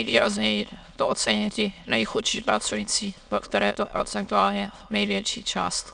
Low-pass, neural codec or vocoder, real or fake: 9.9 kHz; autoencoder, 22.05 kHz, a latent of 192 numbers a frame, VITS, trained on many speakers; fake